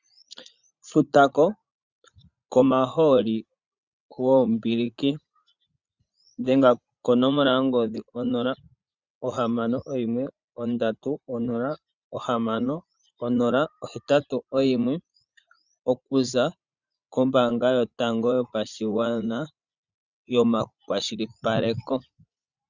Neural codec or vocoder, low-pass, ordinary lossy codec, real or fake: vocoder, 44.1 kHz, 80 mel bands, Vocos; 7.2 kHz; Opus, 64 kbps; fake